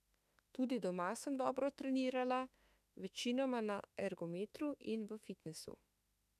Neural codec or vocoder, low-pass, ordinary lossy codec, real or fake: autoencoder, 48 kHz, 32 numbers a frame, DAC-VAE, trained on Japanese speech; 14.4 kHz; none; fake